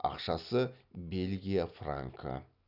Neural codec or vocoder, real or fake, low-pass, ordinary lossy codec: none; real; 5.4 kHz; none